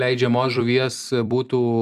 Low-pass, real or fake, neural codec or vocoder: 14.4 kHz; fake; vocoder, 44.1 kHz, 128 mel bands every 256 samples, BigVGAN v2